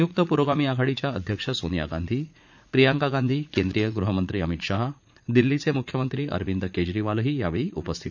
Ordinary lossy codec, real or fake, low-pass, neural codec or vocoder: none; fake; 7.2 kHz; vocoder, 44.1 kHz, 80 mel bands, Vocos